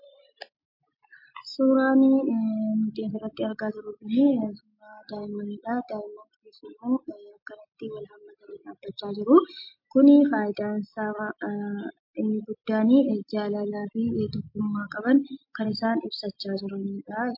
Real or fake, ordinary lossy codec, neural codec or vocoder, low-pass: real; MP3, 32 kbps; none; 5.4 kHz